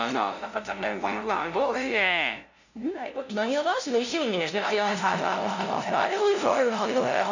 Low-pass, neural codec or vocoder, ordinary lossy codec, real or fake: 7.2 kHz; codec, 16 kHz, 0.5 kbps, FunCodec, trained on LibriTTS, 25 frames a second; none; fake